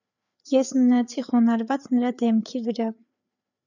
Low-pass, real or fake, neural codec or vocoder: 7.2 kHz; fake; codec, 16 kHz, 4 kbps, FreqCodec, larger model